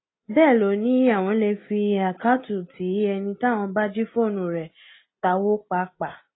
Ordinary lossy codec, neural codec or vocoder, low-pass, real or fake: AAC, 16 kbps; none; 7.2 kHz; real